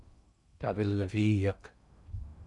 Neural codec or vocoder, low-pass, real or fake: codec, 16 kHz in and 24 kHz out, 0.6 kbps, FocalCodec, streaming, 2048 codes; 10.8 kHz; fake